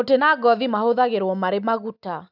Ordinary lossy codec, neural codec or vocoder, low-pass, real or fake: none; none; 5.4 kHz; real